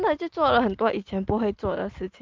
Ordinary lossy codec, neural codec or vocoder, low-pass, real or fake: Opus, 32 kbps; none; 7.2 kHz; real